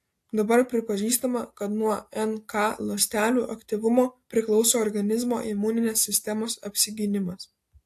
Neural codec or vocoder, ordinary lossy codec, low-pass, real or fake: none; AAC, 64 kbps; 14.4 kHz; real